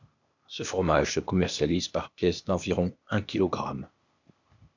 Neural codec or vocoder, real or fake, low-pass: codec, 16 kHz, 0.8 kbps, ZipCodec; fake; 7.2 kHz